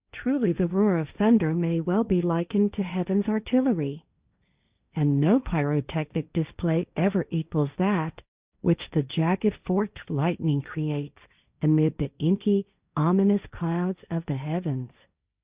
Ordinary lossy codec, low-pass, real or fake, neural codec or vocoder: Opus, 64 kbps; 3.6 kHz; fake; codec, 16 kHz, 1.1 kbps, Voila-Tokenizer